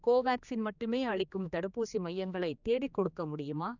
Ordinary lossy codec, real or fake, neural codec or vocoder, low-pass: none; fake; codec, 16 kHz, 2 kbps, X-Codec, HuBERT features, trained on general audio; 7.2 kHz